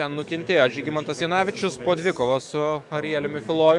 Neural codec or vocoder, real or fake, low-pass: codec, 44.1 kHz, 7.8 kbps, DAC; fake; 10.8 kHz